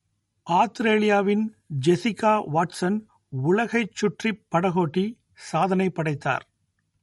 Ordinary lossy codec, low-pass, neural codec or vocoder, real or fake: MP3, 48 kbps; 19.8 kHz; none; real